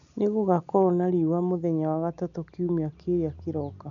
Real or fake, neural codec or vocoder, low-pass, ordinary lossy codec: real; none; 7.2 kHz; none